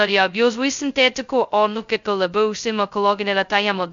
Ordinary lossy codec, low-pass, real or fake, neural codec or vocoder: MP3, 64 kbps; 7.2 kHz; fake; codec, 16 kHz, 0.2 kbps, FocalCodec